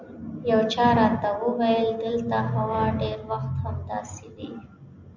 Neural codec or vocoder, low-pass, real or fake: none; 7.2 kHz; real